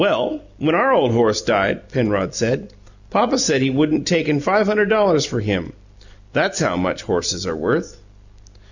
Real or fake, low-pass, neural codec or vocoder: real; 7.2 kHz; none